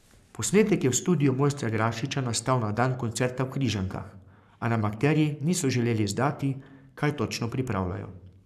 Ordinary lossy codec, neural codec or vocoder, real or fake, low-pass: none; codec, 44.1 kHz, 7.8 kbps, Pupu-Codec; fake; 14.4 kHz